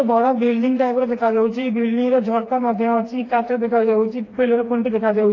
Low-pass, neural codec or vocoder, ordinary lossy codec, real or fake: 7.2 kHz; codec, 16 kHz, 2 kbps, FreqCodec, smaller model; AAC, 32 kbps; fake